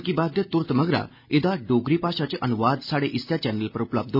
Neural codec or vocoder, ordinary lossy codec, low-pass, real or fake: none; none; 5.4 kHz; real